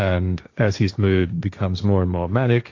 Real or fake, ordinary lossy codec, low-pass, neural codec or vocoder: fake; AAC, 48 kbps; 7.2 kHz; codec, 16 kHz, 1.1 kbps, Voila-Tokenizer